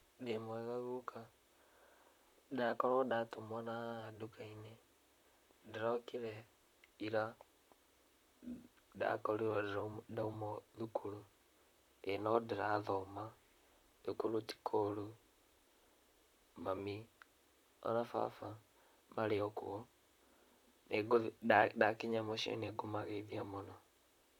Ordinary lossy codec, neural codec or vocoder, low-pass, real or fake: none; vocoder, 44.1 kHz, 128 mel bands, Pupu-Vocoder; 19.8 kHz; fake